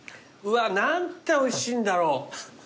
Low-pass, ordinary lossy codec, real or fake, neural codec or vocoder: none; none; real; none